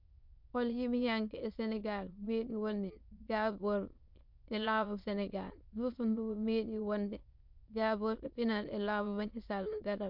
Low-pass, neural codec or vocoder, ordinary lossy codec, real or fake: 5.4 kHz; autoencoder, 22.05 kHz, a latent of 192 numbers a frame, VITS, trained on many speakers; MP3, 48 kbps; fake